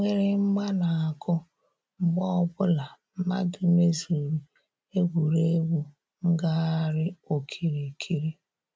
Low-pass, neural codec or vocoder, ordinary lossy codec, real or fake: none; none; none; real